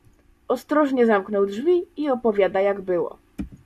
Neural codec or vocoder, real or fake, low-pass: none; real; 14.4 kHz